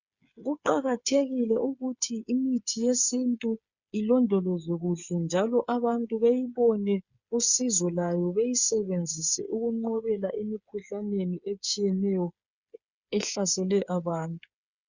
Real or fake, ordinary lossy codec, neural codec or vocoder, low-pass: fake; Opus, 64 kbps; codec, 16 kHz, 8 kbps, FreqCodec, smaller model; 7.2 kHz